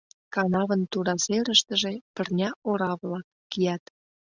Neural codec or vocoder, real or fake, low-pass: none; real; 7.2 kHz